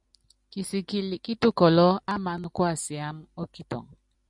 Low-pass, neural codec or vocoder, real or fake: 10.8 kHz; none; real